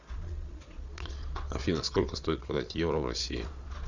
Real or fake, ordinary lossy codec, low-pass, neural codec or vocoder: fake; Opus, 64 kbps; 7.2 kHz; vocoder, 22.05 kHz, 80 mel bands, Vocos